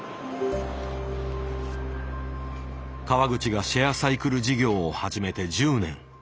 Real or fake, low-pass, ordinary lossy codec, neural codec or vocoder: real; none; none; none